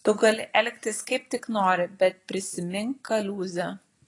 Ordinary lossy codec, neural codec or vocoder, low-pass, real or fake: AAC, 32 kbps; none; 10.8 kHz; real